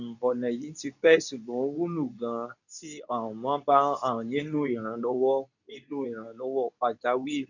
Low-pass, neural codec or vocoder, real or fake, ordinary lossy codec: 7.2 kHz; codec, 24 kHz, 0.9 kbps, WavTokenizer, medium speech release version 2; fake; none